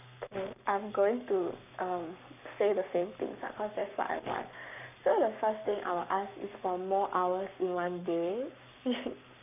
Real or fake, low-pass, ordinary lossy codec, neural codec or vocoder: fake; 3.6 kHz; none; codec, 44.1 kHz, 7.8 kbps, Pupu-Codec